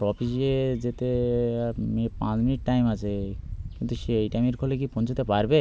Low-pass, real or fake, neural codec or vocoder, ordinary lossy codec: none; real; none; none